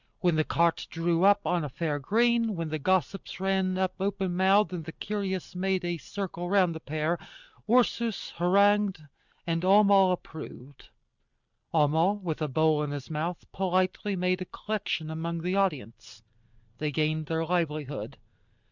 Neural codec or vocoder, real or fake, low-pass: none; real; 7.2 kHz